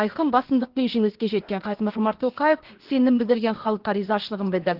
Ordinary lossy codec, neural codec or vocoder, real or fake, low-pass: Opus, 16 kbps; codec, 16 kHz, 0.8 kbps, ZipCodec; fake; 5.4 kHz